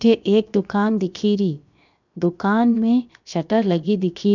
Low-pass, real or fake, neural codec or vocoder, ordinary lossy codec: 7.2 kHz; fake; codec, 16 kHz, 0.7 kbps, FocalCodec; none